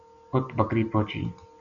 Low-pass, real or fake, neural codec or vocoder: 7.2 kHz; real; none